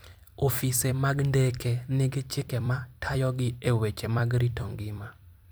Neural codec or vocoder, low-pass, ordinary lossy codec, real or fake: vocoder, 44.1 kHz, 128 mel bands every 256 samples, BigVGAN v2; none; none; fake